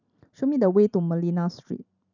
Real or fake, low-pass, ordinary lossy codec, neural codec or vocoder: fake; 7.2 kHz; none; vocoder, 44.1 kHz, 128 mel bands every 512 samples, BigVGAN v2